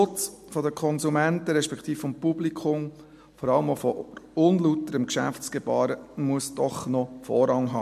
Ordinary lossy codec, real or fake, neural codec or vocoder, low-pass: MP3, 64 kbps; real; none; 14.4 kHz